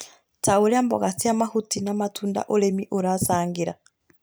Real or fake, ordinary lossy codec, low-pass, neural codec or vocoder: real; none; none; none